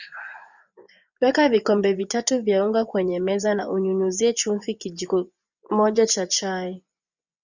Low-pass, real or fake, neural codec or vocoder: 7.2 kHz; fake; vocoder, 24 kHz, 100 mel bands, Vocos